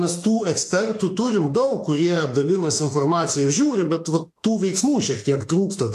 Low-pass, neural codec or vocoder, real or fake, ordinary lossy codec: 14.4 kHz; autoencoder, 48 kHz, 32 numbers a frame, DAC-VAE, trained on Japanese speech; fake; AAC, 64 kbps